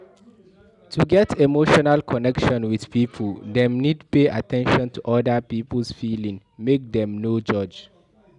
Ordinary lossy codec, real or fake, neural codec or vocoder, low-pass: none; real; none; 10.8 kHz